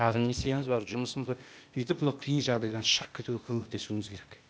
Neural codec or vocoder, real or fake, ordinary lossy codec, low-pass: codec, 16 kHz, 0.8 kbps, ZipCodec; fake; none; none